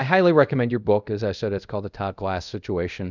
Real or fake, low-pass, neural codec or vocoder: fake; 7.2 kHz; codec, 24 kHz, 0.5 kbps, DualCodec